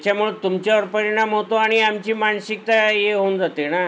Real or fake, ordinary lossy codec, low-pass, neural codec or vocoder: real; none; none; none